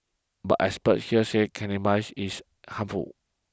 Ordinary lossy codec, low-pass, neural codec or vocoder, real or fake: none; none; none; real